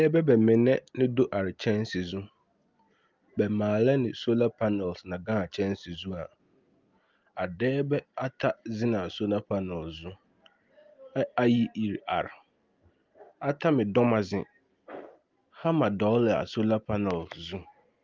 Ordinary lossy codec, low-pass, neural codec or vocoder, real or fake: Opus, 32 kbps; 7.2 kHz; none; real